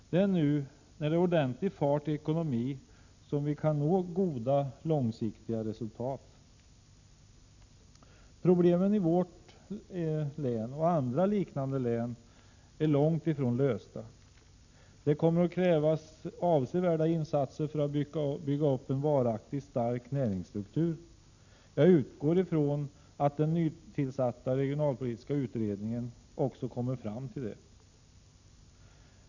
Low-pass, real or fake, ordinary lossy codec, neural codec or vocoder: 7.2 kHz; real; none; none